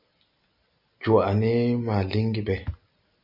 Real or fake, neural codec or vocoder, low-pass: real; none; 5.4 kHz